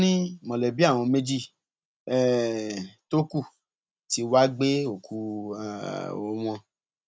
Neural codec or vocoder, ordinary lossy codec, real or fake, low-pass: none; none; real; none